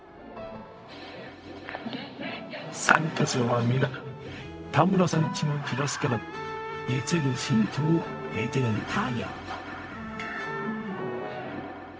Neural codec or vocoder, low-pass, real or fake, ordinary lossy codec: codec, 16 kHz, 0.4 kbps, LongCat-Audio-Codec; none; fake; none